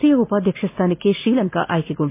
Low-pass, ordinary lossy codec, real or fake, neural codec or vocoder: 3.6 kHz; MP3, 24 kbps; real; none